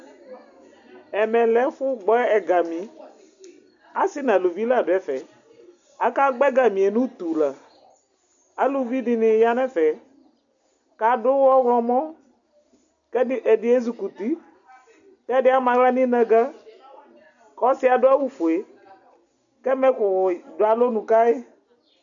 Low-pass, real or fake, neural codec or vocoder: 7.2 kHz; real; none